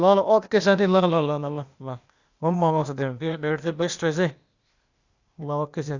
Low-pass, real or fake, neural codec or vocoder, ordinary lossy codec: 7.2 kHz; fake; codec, 16 kHz, 0.8 kbps, ZipCodec; Opus, 64 kbps